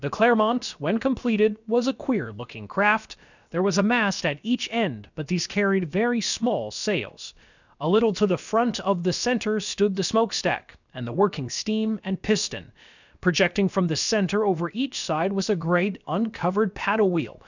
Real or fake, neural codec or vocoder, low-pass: fake; codec, 16 kHz, about 1 kbps, DyCAST, with the encoder's durations; 7.2 kHz